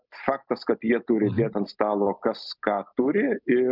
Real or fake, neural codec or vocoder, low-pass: real; none; 5.4 kHz